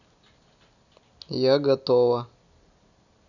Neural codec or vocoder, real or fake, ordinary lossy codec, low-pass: none; real; none; 7.2 kHz